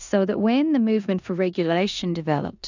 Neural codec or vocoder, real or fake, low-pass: codec, 16 kHz in and 24 kHz out, 0.9 kbps, LongCat-Audio-Codec, four codebook decoder; fake; 7.2 kHz